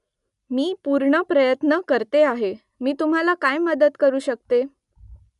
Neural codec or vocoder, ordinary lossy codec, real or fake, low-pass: none; none; real; 10.8 kHz